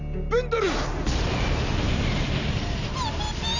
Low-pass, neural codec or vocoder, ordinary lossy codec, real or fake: 7.2 kHz; none; none; real